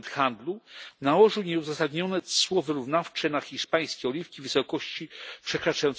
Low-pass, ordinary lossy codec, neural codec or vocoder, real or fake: none; none; none; real